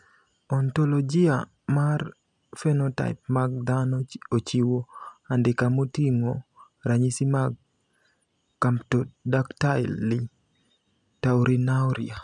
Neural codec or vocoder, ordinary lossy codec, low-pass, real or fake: none; none; 10.8 kHz; real